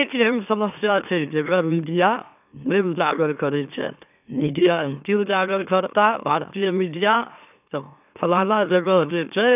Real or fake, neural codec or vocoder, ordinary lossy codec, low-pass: fake; autoencoder, 44.1 kHz, a latent of 192 numbers a frame, MeloTTS; none; 3.6 kHz